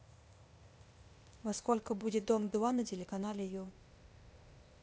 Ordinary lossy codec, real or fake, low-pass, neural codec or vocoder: none; fake; none; codec, 16 kHz, 0.8 kbps, ZipCodec